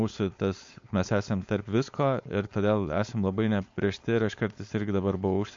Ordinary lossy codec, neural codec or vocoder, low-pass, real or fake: MP3, 48 kbps; codec, 16 kHz, 4.8 kbps, FACodec; 7.2 kHz; fake